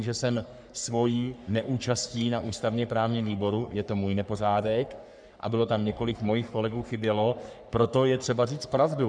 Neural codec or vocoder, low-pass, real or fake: codec, 44.1 kHz, 3.4 kbps, Pupu-Codec; 9.9 kHz; fake